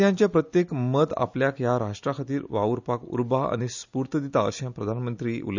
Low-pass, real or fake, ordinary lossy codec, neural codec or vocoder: 7.2 kHz; real; none; none